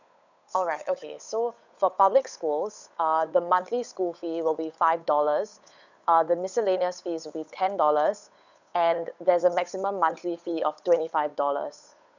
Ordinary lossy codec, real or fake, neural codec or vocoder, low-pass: none; fake; codec, 16 kHz, 8 kbps, FunCodec, trained on Chinese and English, 25 frames a second; 7.2 kHz